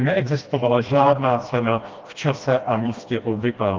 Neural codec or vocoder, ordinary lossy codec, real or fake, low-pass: codec, 16 kHz, 1 kbps, FreqCodec, smaller model; Opus, 32 kbps; fake; 7.2 kHz